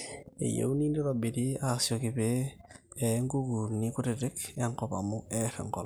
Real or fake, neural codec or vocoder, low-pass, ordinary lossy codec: real; none; none; none